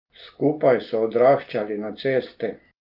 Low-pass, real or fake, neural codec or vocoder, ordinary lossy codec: 5.4 kHz; real; none; Opus, 24 kbps